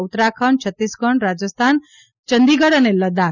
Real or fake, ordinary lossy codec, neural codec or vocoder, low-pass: real; none; none; 7.2 kHz